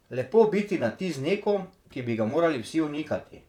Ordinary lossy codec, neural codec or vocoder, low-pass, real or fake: none; vocoder, 44.1 kHz, 128 mel bands, Pupu-Vocoder; 19.8 kHz; fake